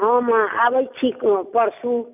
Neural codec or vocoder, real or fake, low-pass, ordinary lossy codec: none; real; 3.6 kHz; none